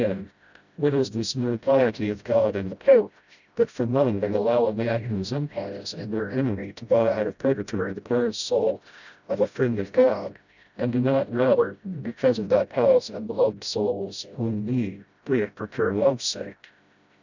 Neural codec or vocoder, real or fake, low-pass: codec, 16 kHz, 0.5 kbps, FreqCodec, smaller model; fake; 7.2 kHz